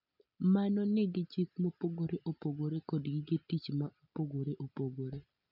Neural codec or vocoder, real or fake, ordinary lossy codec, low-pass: none; real; none; 5.4 kHz